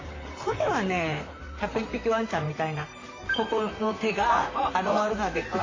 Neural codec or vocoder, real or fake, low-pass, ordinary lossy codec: vocoder, 44.1 kHz, 128 mel bands, Pupu-Vocoder; fake; 7.2 kHz; AAC, 32 kbps